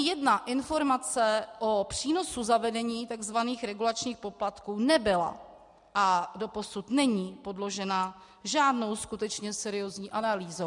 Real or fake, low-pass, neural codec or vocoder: real; 10.8 kHz; none